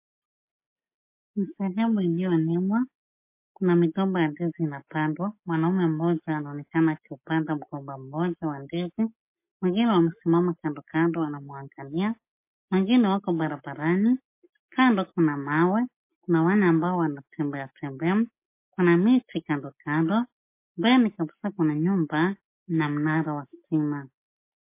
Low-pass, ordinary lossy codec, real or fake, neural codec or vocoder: 3.6 kHz; MP3, 24 kbps; real; none